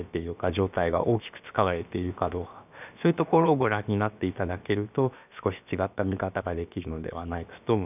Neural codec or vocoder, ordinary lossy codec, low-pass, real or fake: codec, 16 kHz, about 1 kbps, DyCAST, with the encoder's durations; none; 3.6 kHz; fake